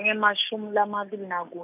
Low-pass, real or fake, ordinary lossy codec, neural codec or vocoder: 3.6 kHz; real; none; none